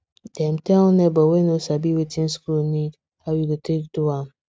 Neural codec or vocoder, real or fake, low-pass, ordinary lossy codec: none; real; none; none